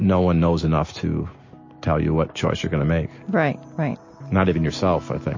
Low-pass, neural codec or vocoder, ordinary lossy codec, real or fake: 7.2 kHz; none; MP3, 32 kbps; real